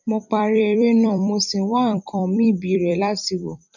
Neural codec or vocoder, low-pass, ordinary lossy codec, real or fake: vocoder, 44.1 kHz, 128 mel bands every 256 samples, BigVGAN v2; 7.2 kHz; none; fake